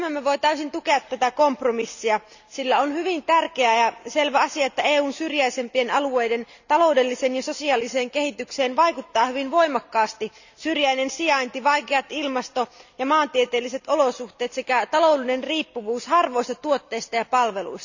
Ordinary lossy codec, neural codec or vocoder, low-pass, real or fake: none; none; 7.2 kHz; real